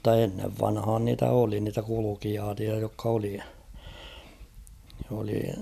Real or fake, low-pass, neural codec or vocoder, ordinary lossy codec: real; 14.4 kHz; none; none